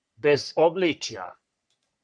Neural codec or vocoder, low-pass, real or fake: codec, 44.1 kHz, 3.4 kbps, Pupu-Codec; 9.9 kHz; fake